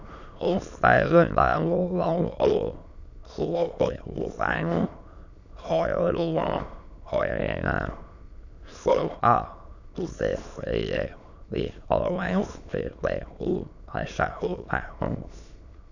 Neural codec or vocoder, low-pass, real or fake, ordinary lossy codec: autoencoder, 22.05 kHz, a latent of 192 numbers a frame, VITS, trained on many speakers; 7.2 kHz; fake; none